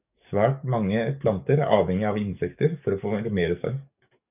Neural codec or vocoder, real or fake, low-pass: codec, 44.1 kHz, 7.8 kbps, DAC; fake; 3.6 kHz